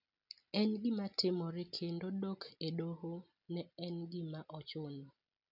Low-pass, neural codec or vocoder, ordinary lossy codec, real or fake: 5.4 kHz; none; none; real